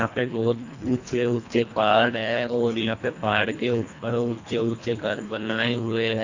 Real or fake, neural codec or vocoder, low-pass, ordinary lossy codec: fake; codec, 24 kHz, 1.5 kbps, HILCodec; 7.2 kHz; AAC, 48 kbps